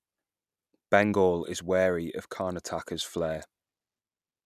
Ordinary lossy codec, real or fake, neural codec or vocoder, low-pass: none; real; none; 14.4 kHz